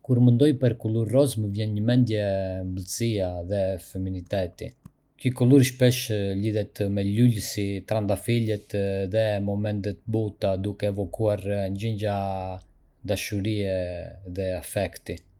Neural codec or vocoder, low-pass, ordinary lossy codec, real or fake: none; 19.8 kHz; Opus, 32 kbps; real